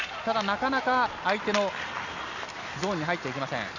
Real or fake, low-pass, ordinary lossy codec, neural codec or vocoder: real; 7.2 kHz; none; none